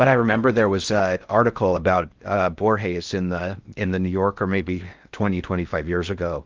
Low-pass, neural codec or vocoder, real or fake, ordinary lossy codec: 7.2 kHz; codec, 16 kHz in and 24 kHz out, 0.6 kbps, FocalCodec, streaming, 4096 codes; fake; Opus, 24 kbps